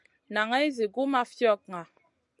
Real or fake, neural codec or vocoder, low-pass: real; none; 10.8 kHz